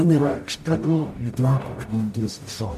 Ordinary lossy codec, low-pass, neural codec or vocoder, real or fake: MP3, 64 kbps; 14.4 kHz; codec, 44.1 kHz, 0.9 kbps, DAC; fake